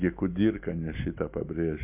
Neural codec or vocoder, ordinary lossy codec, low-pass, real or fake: none; MP3, 32 kbps; 3.6 kHz; real